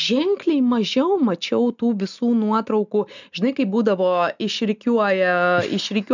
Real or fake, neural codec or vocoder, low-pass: real; none; 7.2 kHz